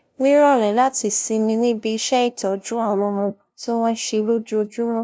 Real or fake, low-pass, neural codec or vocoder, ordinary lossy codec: fake; none; codec, 16 kHz, 0.5 kbps, FunCodec, trained on LibriTTS, 25 frames a second; none